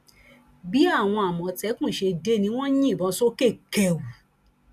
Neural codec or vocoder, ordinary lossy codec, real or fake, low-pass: none; none; real; 14.4 kHz